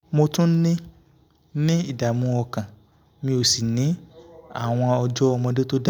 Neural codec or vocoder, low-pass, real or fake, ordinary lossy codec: none; none; real; none